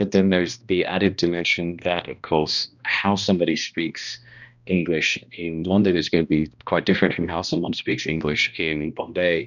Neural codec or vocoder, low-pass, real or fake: codec, 16 kHz, 1 kbps, X-Codec, HuBERT features, trained on balanced general audio; 7.2 kHz; fake